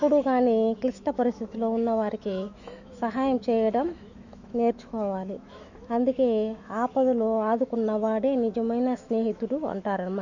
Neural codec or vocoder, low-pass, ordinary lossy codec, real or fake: autoencoder, 48 kHz, 128 numbers a frame, DAC-VAE, trained on Japanese speech; 7.2 kHz; none; fake